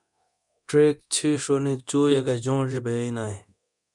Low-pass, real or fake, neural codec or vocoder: 10.8 kHz; fake; codec, 24 kHz, 0.9 kbps, DualCodec